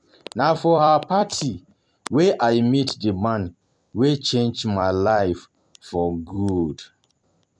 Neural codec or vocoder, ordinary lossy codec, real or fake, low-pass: vocoder, 44.1 kHz, 128 mel bands every 256 samples, BigVGAN v2; none; fake; 9.9 kHz